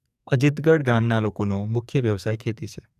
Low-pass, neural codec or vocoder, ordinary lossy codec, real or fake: 14.4 kHz; codec, 44.1 kHz, 2.6 kbps, SNAC; none; fake